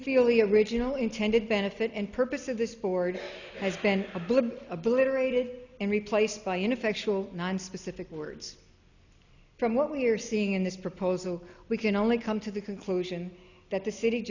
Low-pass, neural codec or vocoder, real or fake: 7.2 kHz; none; real